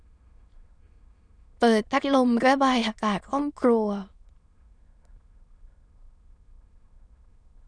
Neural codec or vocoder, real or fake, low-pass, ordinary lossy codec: autoencoder, 22.05 kHz, a latent of 192 numbers a frame, VITS, trained on many speakers; fake; 9.9 kHz; none